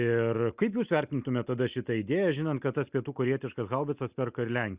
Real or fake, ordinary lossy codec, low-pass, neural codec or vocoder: real; Opus, 64 kbps; 3.6 kHz; none